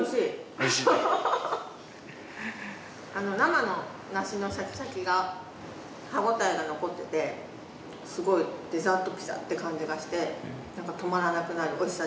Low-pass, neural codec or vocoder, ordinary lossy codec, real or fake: none; none; none; real